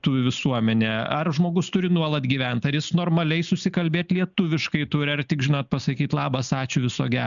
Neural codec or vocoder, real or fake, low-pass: none; real; 7.2 kHz